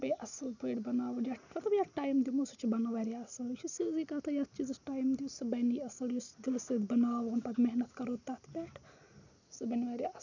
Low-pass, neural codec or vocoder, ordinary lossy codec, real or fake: 7.2 kHz; none; none; real